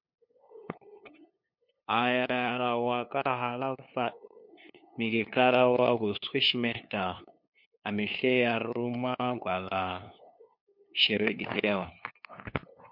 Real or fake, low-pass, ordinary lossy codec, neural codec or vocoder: fake; 5.4 kHz; MP3, 48 kbps; codec, 16 kHz, 2 kbps, FunCodec, trained on LibriTTS, 25 frames a second